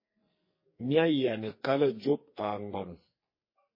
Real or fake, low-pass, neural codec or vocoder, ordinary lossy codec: fake; 5.4 kHz; codec, 44.1 kHz, 2.6 kbps, SNAC; MP3, 24 kbps